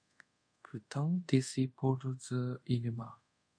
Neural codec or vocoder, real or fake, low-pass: codec, 24 kHz, 0.5 kbps, DualCodec; fake; 9.9 kHz